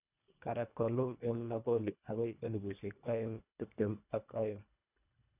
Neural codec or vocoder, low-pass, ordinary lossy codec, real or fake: codec, 24 kHz, 1.5 kbps, HILCodec; 3.6 kHz; none; fake